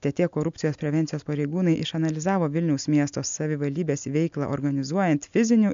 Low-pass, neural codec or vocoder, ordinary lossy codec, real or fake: 7.2 kHz; none; MP3, 64 kbps; real